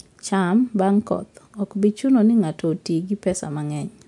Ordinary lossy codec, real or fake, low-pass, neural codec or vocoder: MP3, 64 kbps; real; 10.8 kHz; none